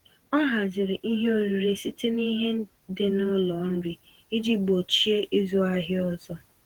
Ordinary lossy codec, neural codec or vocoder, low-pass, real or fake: Opus, 16 kbps; vocoder, 48 kHz, 128 mel bands, Vocos; 19.8 kHz; fake